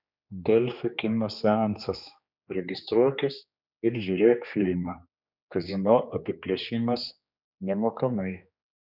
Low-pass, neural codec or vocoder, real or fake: 5.4 kHz; codec, 16 kHz, 2 kbps, X-Codec, HuBERT features, trained on general audio; fake